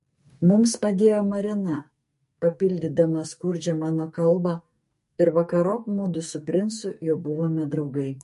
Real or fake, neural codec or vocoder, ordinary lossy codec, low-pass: fake; codec, 44.1 kHz, 2.6 kbps, SNAC; MP3, 48 kbps; 14.4 kHz